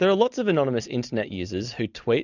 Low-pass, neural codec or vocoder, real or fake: 7.2 kHz; none; real